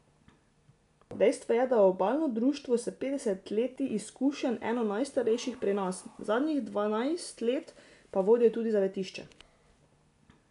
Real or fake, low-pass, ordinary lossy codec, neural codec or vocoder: real; 10.8 kHz; none; none